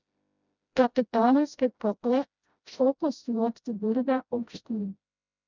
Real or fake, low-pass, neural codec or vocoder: fake; 7.2 kHz; codec, 16 kHz, 0.5 kbps, FreqCodec, smaller model